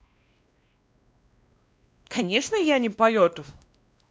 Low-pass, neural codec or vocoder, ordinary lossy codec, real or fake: none; codec, 16 kHz, 1 kbps, X-Codec, WavLM features, trained on Multilingual LibriSpeech; none; fake